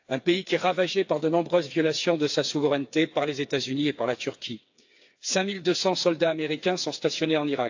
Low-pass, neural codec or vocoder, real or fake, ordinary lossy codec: 7.2 kHz; codec, 16 kHz, 4 kbps, FreqCodec, smaller model; fake; AAC, 48 kbps